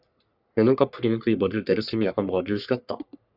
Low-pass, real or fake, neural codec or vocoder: 5.4 kHz; fake; codec, 44.1 kHz, 3.4 kbps, Pupu-Codec